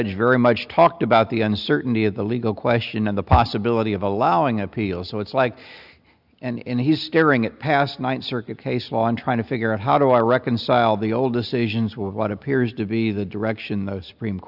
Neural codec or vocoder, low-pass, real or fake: none; 5.4 kHz; real